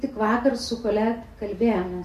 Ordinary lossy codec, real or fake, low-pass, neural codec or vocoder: MP3, 64 kbps; real; 14.4 kHz; none